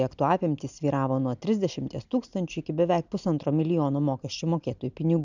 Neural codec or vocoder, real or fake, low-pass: none; real; 7.2 kHz